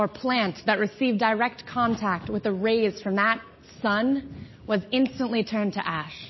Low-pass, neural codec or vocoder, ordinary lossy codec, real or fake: 7.2 kHz; none; MP3, 24 kbps; real